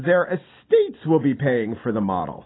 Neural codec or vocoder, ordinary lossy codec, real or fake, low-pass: none; AAC, 16 kbps; real; 7.2 kHz